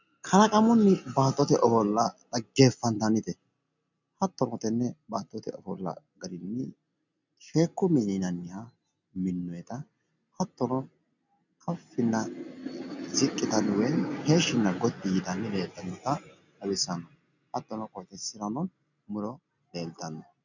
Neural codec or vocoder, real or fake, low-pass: none; real; 7.2 kHz